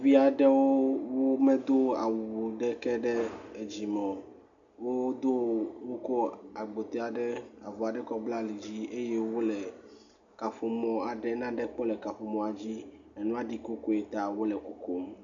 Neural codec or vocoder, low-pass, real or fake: none; 7.2 kHz; real